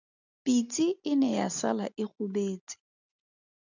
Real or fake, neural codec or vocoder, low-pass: real; none; 7.2 kHz